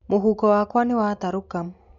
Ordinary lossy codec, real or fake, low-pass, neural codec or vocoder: MP3, 64 kbps; real; 7.2 kHz; none